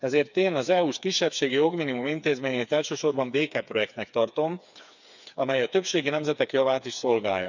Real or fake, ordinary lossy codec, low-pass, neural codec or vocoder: fake; none; 7.2 kHz; codec, 16 kHz, 4 kbps, FreqCodec, smaller model